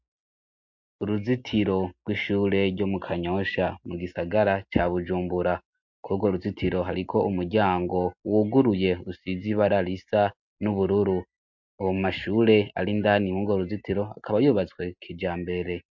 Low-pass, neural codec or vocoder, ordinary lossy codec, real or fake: 7.2 kHz; none; MP3, 48 kbps; real